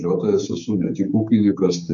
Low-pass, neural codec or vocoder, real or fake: 7.2 kHz; codec, 16 kHz, 4 kbps, X-Codec, HuBERT features, trained on general audio; fake